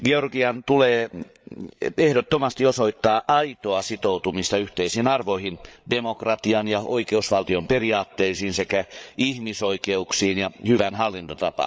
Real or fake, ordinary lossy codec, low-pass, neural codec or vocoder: fake; none; none; codec, 16 kHz, 8 kbps, FreqCodec, larger model